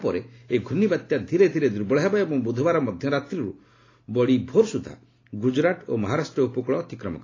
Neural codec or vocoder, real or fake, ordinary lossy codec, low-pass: none; real; AAC, 32 kbps; 7.2 kHz